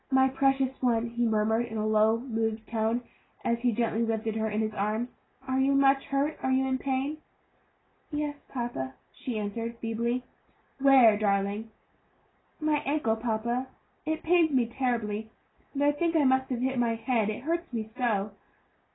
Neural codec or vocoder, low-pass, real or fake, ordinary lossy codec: none; 7.2 kHz; real; AAC, 16 kbps